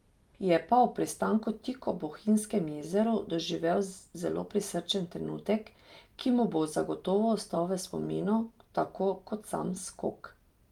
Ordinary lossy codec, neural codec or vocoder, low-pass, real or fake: Opus, 32 kbps; none; 19.8 kHz; real